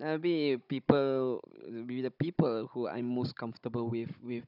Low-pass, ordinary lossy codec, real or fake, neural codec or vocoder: 5.4 kHz; none; fake; codec, 16 kHz, 16 kbps, FunCodec, trained on Chinese and English, 50 frames a second